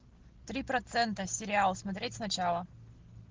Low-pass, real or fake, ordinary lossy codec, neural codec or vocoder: 7.2 kHz; real; Opus, 16 kbps; none